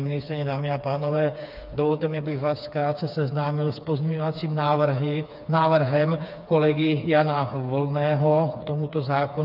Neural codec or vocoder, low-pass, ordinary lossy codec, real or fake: codec, 16 kHz, 4 kbps, FreqCodec, smaller model; 5.4 kHz; AAC, 48 kbps; fake